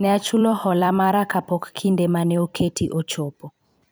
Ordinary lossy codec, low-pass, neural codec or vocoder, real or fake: none; none; vocoder, 44.1 kHz, 128 mel bands every 256 samples, BigVGAN v2; fake